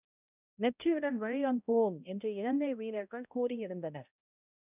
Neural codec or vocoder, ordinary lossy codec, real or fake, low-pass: codec, 16 kHz, 0.5 kbps, X-Codec, HuBERT features, trained on balanced general audio; none; fake; 3.6 kHz